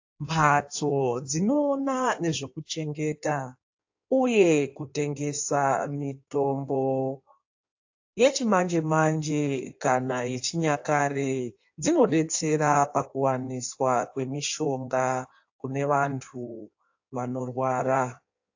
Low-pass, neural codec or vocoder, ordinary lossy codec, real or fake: 7.2 kHz; codec, 16 kHz in and 24 kHz out, 1.1 kbps, FireRedTTS-2 codec; AAC, 48 kbps; fake